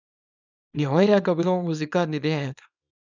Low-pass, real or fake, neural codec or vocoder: 7.2 kHz; fake; codec, 24 kHz, 0.9 kbps, WavTokenizer, small release